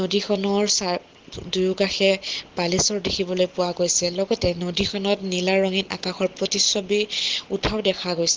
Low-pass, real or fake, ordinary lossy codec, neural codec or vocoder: 7.2 kHz; real; Opus, 16 kbps; none